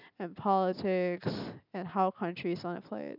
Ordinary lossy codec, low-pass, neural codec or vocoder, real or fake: none; 5.4 kHz; none; real